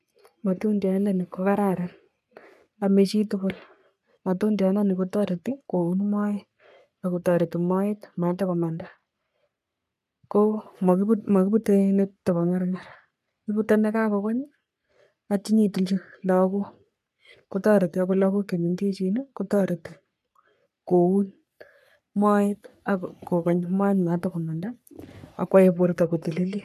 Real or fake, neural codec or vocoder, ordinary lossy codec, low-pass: fake; codec, 44.1 kHz, 3.4 kbps, Pupu-Codec; none; 14.4 kHz